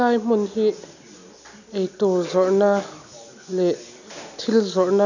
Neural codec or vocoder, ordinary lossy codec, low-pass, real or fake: none; none; 7.2 kHz; real